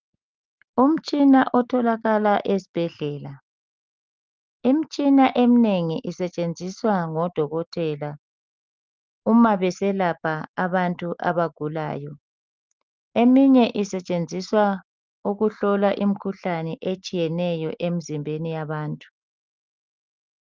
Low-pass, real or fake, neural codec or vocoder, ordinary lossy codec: 7.2 kHz; real; none; Opus, 32 kbps